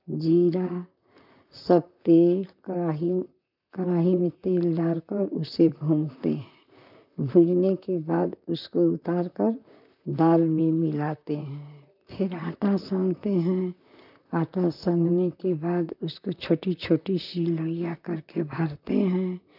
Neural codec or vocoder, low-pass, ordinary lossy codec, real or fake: vocoder, 44.1 kHz, 128 mel bands, Pupu-Vocoder; 5.4 kHz; none; fake